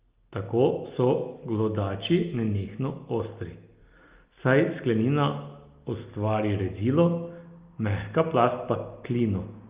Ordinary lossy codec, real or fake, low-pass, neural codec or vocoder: Opus, 32 kbps; real; 3.6 kHz; none